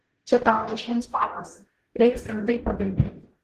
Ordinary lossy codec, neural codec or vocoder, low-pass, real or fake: Opus, 16 kbps; codec, 44.1 kHz, 0.9 kbps, DAC; 14.4 kHz; fake